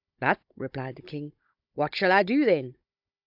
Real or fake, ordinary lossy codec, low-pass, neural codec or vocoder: fake; AAC, 48 kbps; 5.4 kHz; codec, 16 kHz, 16 kbps, FunCodec, trained on Chinese and English, 50 frames a second